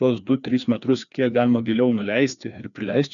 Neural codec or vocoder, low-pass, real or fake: codec, 16 kHz, 2 kbps, FreqCodec, larger model; 7.2 kHz; fake